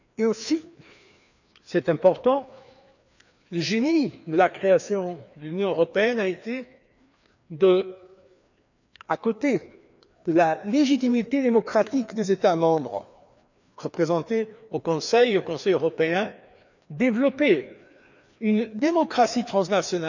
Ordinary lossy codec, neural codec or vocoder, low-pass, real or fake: none; codec, 16 kHz, 2 kbps, FreqCodec, larger model; 7.2 kHz; fake